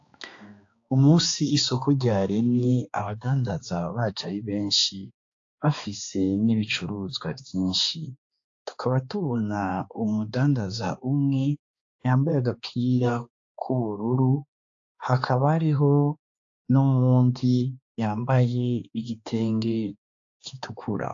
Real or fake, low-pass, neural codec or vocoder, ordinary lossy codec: fake; 7.2 kHz; codec, 16 kHz, 2 kbps, X-Codec, HuBERT features, trained on balanced general audio; AAC, 48 kbps